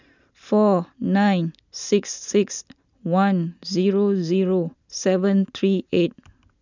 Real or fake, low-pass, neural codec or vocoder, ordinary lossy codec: real; 7.2 kHz; none; none